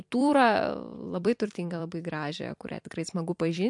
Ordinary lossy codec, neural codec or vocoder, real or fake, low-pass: MP3, 64 kbps; vocoder, 44.1 kHz, 128 mel bands every 256 samples, BigVGAN v2; fake; 10.8 kHz